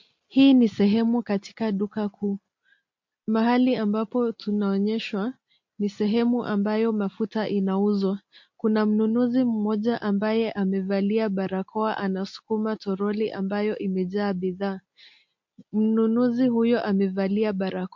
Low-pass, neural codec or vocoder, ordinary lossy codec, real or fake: 7.2 kHz; none; MP3, 48 kbps; real